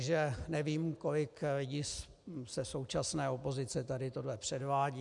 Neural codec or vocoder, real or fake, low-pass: none; real; 10.8 kHz